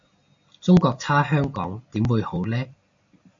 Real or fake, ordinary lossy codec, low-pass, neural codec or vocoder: real; AAC, 64 kbps; 7.2 kHz; none